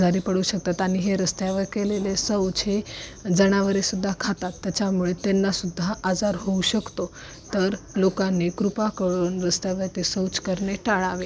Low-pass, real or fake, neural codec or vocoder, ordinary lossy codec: none; real; none; none